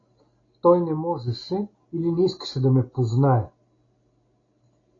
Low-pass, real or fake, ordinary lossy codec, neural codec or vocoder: 7.2 kHz; real; AAC, 32 kbps; none